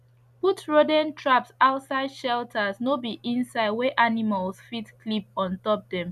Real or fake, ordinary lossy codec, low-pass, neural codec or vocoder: real; none; 14.4 kHz; none